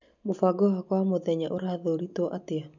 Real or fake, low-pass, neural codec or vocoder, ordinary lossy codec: real; 7.2 kHz; none; none